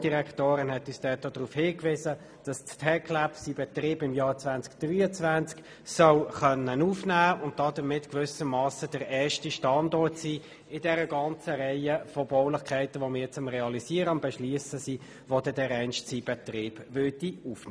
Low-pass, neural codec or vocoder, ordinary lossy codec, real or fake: none; none; none; real